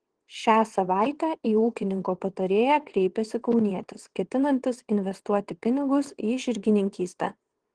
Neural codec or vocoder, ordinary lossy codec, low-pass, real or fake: vocoder, 22.05 kHz, 80 mel bands, WaveNeXt; Opus, 16 kbps; 9.9 kHz; fake